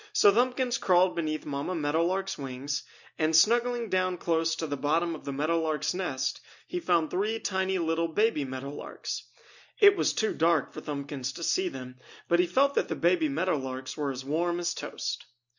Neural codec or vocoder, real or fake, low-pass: none; real; 7.2 kHz